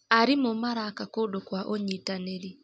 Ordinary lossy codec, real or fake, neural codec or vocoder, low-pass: none; real; none; none